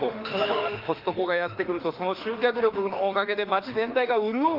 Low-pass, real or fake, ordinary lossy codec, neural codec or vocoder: 5.4 kHz; fake; Opus, 24 kbps; autoencoder, 48 kHz, 32 numbers a frame, DAC-VAE, trained on Japanese speech